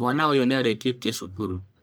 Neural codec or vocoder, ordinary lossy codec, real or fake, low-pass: codec, 44.1 kHz, 1.7 kbps, Pupu-Codec; none; fake; none